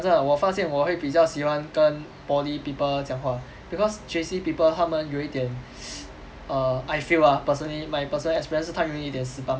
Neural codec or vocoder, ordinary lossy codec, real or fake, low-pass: none; none; real; none